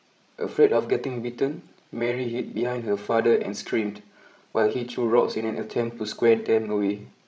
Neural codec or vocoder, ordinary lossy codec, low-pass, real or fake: codec, 16 kHz, 16 kbps, FreqCodec, larger model; none; none; fake